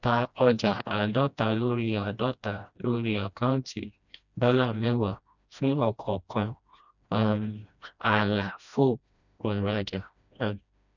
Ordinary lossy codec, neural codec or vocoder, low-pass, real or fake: none; codec, 16 kHz, 1 kbps, FreqCodec, smaller model; 7.2 kHz; fake